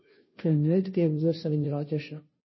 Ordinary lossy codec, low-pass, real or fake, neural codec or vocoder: MP3, 24 kbps; 7.2 kHz; fake; codec, 16 kHz, 0.5 kbps, FunCodec, trained on Chinese and English, 25 frames a second